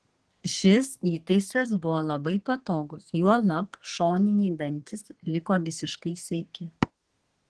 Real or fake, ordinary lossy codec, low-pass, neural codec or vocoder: fake; Opus, 16 kbps; 10.8 kHz; codec, 32 kHz, 1.9 kbps, SNAC